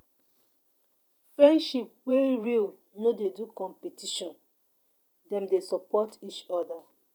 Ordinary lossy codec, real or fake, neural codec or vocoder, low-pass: none; fake; vocoder, 44.1 kHz, 128 mel bands every 512 samples, BigVGAN v2; 19.8 kHz